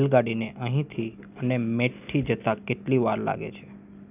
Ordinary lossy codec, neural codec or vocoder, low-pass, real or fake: none; none; 3.6 kHz; real